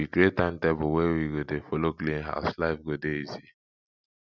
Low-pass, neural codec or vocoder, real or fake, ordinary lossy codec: 7.2 kHz; none; real; none